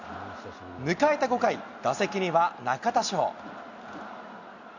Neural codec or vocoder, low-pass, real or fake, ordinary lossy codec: none; 7.2 kHz; real; none